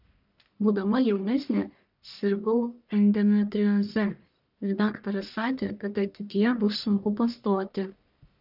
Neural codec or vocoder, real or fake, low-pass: codec, 44.1 kHz, 1.7 kbps, Pupu-Codec; fake; 5.4 kHz